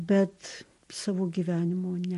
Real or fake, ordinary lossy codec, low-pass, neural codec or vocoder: real; MP3, 48 kbps; 14.4 kHz; none